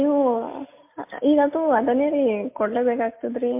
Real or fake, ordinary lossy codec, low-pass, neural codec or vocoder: real; MP3, 24 kbps; 3.6 kHz; none